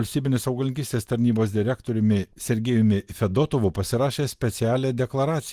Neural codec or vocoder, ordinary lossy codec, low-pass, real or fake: none; Opus, 24 kbps; 14.4 kHz; real